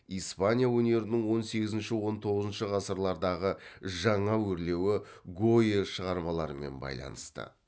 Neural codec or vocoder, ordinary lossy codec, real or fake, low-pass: none; none; real; none